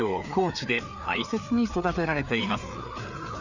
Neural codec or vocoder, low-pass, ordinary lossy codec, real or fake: codec, 16 kHz, 4 kbps, FreqCodec, larger model; 7.2 kHz; none; fake